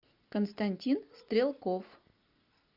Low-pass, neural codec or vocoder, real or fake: 5.4 kHz; none; real